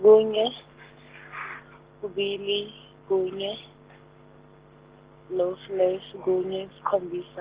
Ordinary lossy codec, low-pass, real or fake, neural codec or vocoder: Opus, 16 kbps; 3.6 kHz; real; none